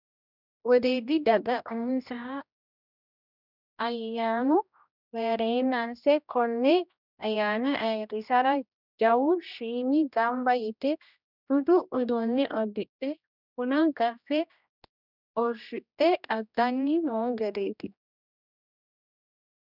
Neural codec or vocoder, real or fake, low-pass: codec, 16 kHz, 1 kbps, X-Codec, HuBERT features, trained on general audio; fake; 5.4 kHz